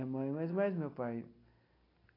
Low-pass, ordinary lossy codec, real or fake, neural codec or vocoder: 5.4 kHz; AAC, 24 kbps; real; none